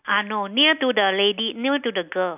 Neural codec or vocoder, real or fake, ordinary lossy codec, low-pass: none; real; none; 3.6 kHz